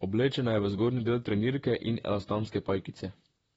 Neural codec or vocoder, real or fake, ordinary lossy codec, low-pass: vocoder, 44.1 kHz, 128 mel bands, Pupu-Vocoder; fake; AAC, 24 kbps; 19.8 kHz